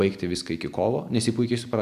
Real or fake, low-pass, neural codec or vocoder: real; 14.4 kHz; none